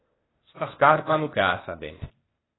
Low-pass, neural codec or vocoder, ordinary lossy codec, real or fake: 7.2 kHz; codec, 16 kHz, 0.8 kbps, ZipCodec; AAC, 16 kbps; fake